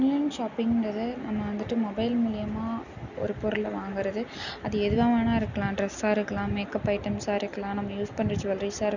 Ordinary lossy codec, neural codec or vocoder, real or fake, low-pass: none; none; real; 7.2 kHz